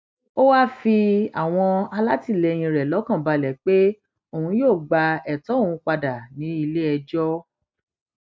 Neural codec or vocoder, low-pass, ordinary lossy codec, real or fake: none; none; none; real